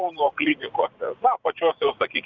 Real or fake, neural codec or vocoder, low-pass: fake; vocoder, 44.1 kHz, 80 mel bands, Vocos; 7.2 kHz